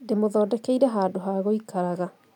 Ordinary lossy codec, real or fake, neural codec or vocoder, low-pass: none; real; none; 19.8 kHz